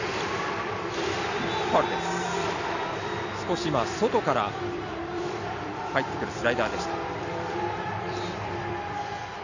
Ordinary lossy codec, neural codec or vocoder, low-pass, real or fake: none; vocoder, 44.1 kHz, 128 mel bands every 256 samples, BigVGAN v2; 7.2 kHz; fake